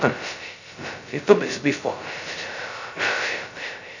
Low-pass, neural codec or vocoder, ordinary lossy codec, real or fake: 7.2 kHz; codec, 16 kHz, 0.2 kbps, FocalCodec; none; fake